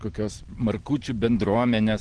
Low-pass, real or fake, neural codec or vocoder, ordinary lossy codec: 9.9 kHz; real; none; Opus, 16 kbps